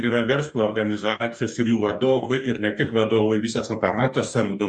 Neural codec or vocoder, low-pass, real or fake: codec, 44.1 kHz, 2.6 kbps, DAC; 10.8 kHz; fake